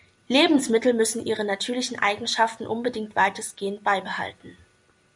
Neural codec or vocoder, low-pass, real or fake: none; 10.8 kHz; real